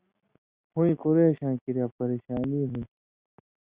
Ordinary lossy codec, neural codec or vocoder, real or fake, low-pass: Opus, 64 kbps; none; real; 3.6 kHz